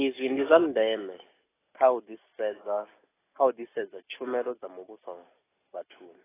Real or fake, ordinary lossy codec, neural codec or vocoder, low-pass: real; AAC, 16 kbps; none; 3.6 kHz